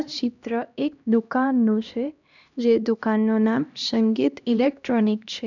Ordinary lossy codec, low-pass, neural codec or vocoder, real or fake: none; 7.2 kHz; codec, 16 kHz, 1 kbps, X-Codec, HuBERT features, trained on LibriSpeech; fake